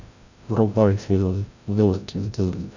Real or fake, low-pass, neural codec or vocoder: fake; 7.2 kHz; codec, 16 kHz, 0.5 kbps, FreqCodec, larger model